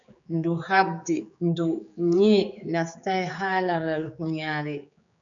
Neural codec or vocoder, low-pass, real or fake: codec, 16 kHz, 4 kbps, X-Codec, HuBERT features, trained on general audio; 7.2 kHz; fake